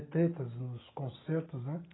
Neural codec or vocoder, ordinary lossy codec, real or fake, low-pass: none; AAC, 16 kbps; real; 7.2 kHz